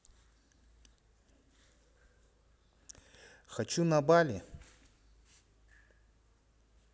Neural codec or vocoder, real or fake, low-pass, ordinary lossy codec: none; real; none; none